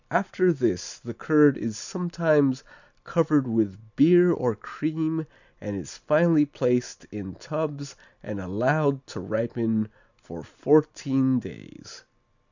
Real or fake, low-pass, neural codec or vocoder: real; 7.2 kHz; none